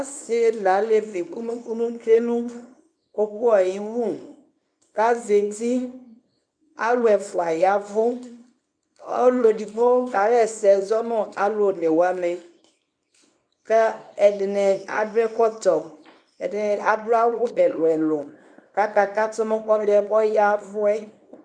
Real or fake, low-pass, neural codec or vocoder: fake; 9.9 kHz; codec, 24 kHz, 0.9 kbps, WavTokenizer, small release